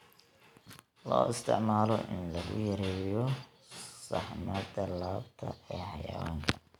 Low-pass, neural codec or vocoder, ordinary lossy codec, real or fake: 19.8 kHz; none; none; real